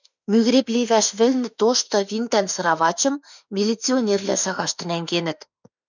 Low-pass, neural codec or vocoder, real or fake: 7.2 kHz; autoencoder, 48 kHz, 32 numbers a frame, DAC-VAE, trained on Japanese speech; fake